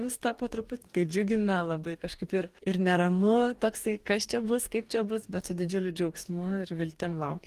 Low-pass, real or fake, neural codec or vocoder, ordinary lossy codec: 14.4 kHz; fake; codec, 44.1 kHz, 2.6 kbps, DAC; Opus, 24 kbps